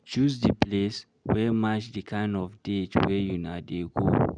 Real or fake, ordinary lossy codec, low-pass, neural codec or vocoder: real; none; 9.9 kHz; none